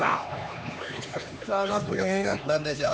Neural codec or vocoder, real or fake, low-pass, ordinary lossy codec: codec, 16 kHz, 2 kbps, X-Codec, HuBERT features, trained on LibriSpeech; fake; none; none